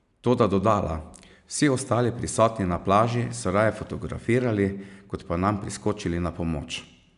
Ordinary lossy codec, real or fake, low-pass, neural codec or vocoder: none; real; 10.8 kHz; none